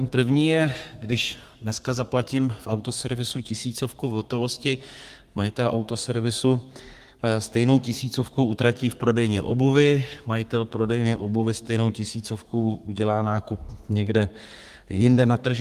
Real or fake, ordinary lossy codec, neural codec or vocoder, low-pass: fake; Opus, 32 kbps; codec, 32 kHz, 1.9 kbps, SNAC; 14.4 kHz